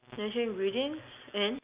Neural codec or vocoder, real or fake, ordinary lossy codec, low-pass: none; real; Opus, 64 kbps; 3.6 kHz